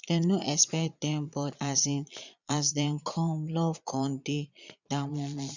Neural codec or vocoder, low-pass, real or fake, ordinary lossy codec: vocoder, 22.05 kHz, 80 mel bands, Vocos; 7.2 kHz; fake; none